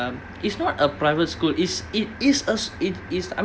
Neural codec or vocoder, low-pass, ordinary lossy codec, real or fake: none; none; none; real